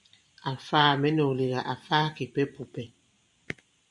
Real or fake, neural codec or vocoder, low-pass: fake; vocoder, 44.1 kHz, 128 mel bands every 512 samples, BigVGAN v2; 10.8 kHz